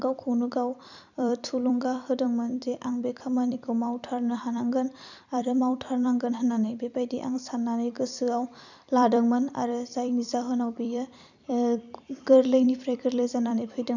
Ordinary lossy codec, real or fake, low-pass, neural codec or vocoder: none; fake; 7.2 kHz; vocoder, 44.1 kHz, 128 mel bands every 256 samples, BigVGAN v2